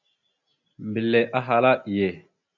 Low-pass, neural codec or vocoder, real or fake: 7.2 kHz; none; real